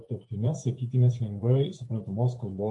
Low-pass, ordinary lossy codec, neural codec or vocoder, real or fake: 10.8 kHz; AAC, 64 kbps; vocoder, 24 kHz, 100 mel bands, Vocos; fake